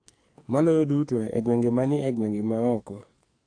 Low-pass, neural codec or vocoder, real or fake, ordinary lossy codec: 9.9 kHz; codec, 44.1 kHz, 2.6 kbps, SNAC; fake; AAC, 48 kbps